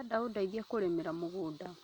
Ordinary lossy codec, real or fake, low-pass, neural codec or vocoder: none; real; 19.8 kHz; none